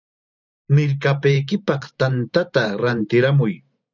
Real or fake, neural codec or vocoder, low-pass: fake; vocoder, 44.1 kHz, 128 mel bands every 512 samples, BigVGAN v2; 7.2 kHz